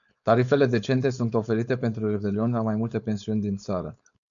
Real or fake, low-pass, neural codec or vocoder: fake; 7.2 kHz; codec, 16 kHz, 4.8 kbps, FACodec